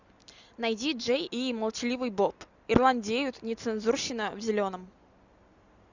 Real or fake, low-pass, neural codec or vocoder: real; 7.2 kHz; none